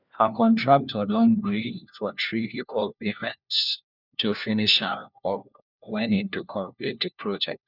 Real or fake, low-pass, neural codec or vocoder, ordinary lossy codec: fake; 5.4 kHz; codec, 16 kHz, 1 kbps, FunCodec, trained on LibriTTS, 50 frames a second; none